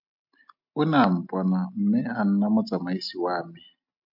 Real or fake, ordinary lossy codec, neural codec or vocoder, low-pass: real; MP3, 48 kbps; none; 5.4 kHz